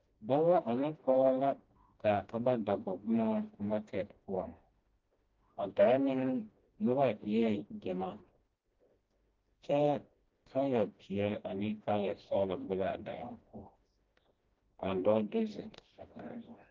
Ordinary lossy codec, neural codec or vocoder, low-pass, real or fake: Opus, 32 kbps; codec, 16 kHz, 1 kbps, FreqCodec, smaller model; 7.2 kHz; fake